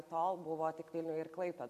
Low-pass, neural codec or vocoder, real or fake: 14.4 kHz; none; real